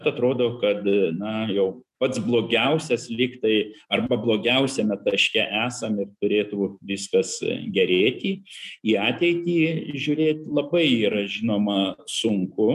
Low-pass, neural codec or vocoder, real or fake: 14.4 kHz; none; real